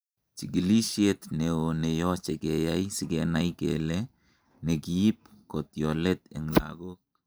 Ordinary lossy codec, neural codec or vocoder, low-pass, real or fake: none; vocoder, 44.1 kHz, 128 mel bands every 512 samples, BigVGAN v2; none; fake